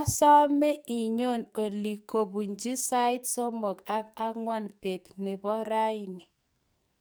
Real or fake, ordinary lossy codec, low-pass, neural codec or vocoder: fake; none; none; codec, 44.1 kHz, 2.6 kbps, SNAC